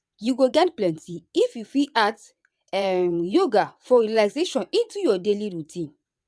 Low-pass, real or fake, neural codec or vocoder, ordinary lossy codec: none; fake; vocoder, 22.05 kHz, 80 mel bands, WaveNeXt; none